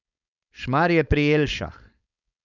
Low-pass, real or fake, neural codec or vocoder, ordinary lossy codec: 7.2 kHz; fake; codec, 16 kHz, 4.8 kbps, FACodec; none